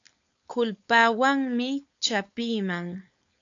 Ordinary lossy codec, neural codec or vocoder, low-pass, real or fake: AAC, 64 kbps; codec, 16 kHz, 4.8 kbps, FACodec; 7.2 kHz; fake